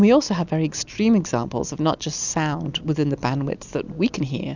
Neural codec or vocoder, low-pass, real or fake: none; 7.2 kHz; real